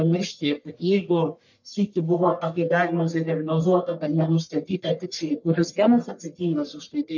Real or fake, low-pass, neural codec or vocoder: fake; 7.2 kHz; codec, 44.1 kHz, 1.7 kbps, Pupu-Codec